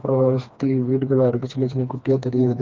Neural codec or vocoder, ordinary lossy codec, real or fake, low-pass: codec, 16 kHz, 2 kbps, FreqCodec, smaller model; Opus, 32 kbps; fake; 7.2 kHz